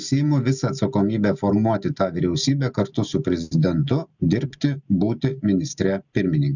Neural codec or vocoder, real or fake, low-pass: none; real; 7.2 kHz